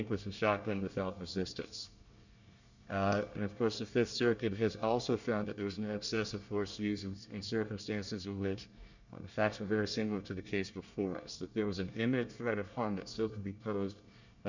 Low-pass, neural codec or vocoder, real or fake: 7.2 kHz; codec, 24 kHz, 1 kbps, SNAC; fake